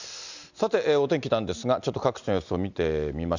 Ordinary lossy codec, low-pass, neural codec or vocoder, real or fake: none; 7.2 kHz; none; real